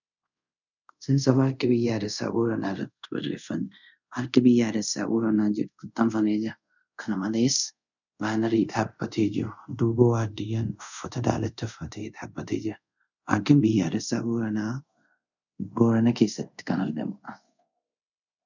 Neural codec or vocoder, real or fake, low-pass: codec, 24 kHz, 0.5 kbps, DualCodec; fake; 7.2 kHz